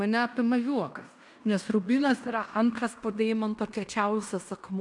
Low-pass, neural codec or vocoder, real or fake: 10.8 kHz; codec, 16 kHz in and 24 kHz out, 0.9 kbps, LongCat-Audio-Codec, fine tuned four codebook decoder; fake